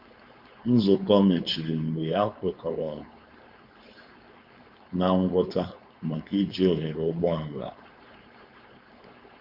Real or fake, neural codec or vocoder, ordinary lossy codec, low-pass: fake; codec, 16 kHz, 4.8 kbps, FACodec; Opus, 64 kbps; 5.4 kHz